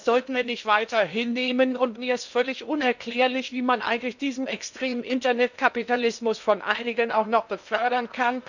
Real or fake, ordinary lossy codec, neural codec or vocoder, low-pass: fake; none; codec, 16 kHz in and 24 kHz out, 0.8 kbps, FocalCodec, streaming, 65536 codes; 7.2 kHz